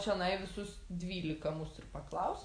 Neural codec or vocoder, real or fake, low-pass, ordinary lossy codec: none; real; 9.9 kHz; AAC, 48 kbps